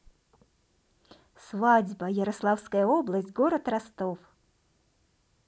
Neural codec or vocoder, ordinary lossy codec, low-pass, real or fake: none; none; none; real